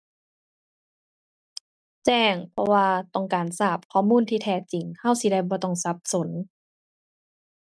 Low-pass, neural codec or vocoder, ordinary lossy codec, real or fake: none; none; none; real